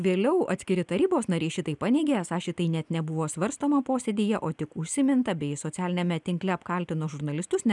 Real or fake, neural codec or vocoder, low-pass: real; none; 10.8 kHz